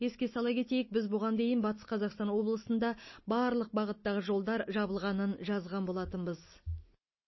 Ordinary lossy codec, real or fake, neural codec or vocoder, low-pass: MP3, 24 kbps; real; none; 7.2 kHz